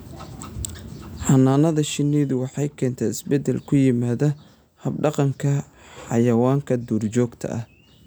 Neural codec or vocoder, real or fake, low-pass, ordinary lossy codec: none; real; none; none